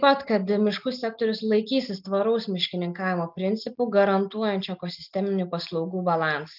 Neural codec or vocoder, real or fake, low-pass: none; real; 5.4 kHz